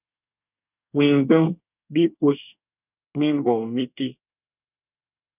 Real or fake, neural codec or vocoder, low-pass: fake; codec, 24 kHz, 1 kbps, SNAC; 3.6 kHz